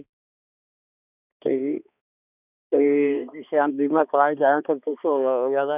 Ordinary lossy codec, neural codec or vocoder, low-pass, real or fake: none; codec, 16 kHz, 2 kbps, X-Codec, HuBERT features, trained on balanced general audio; 3.6 kHz; fake